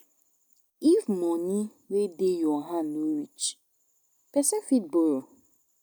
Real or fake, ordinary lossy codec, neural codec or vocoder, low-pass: real; none; none; none